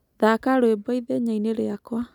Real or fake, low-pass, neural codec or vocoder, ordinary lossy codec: real; 19.8 kHz; none; none